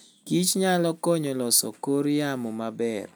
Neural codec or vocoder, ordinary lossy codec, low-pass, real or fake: none; none; none; real